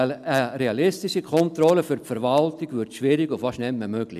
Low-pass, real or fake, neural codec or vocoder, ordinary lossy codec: 14.4 kHz; real; none; none